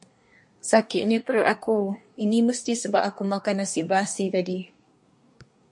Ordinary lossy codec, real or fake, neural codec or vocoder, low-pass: MP3, 48 kbps; fake; codec, 24 kHz, 1 kbps, SNAC; 10.8 kHz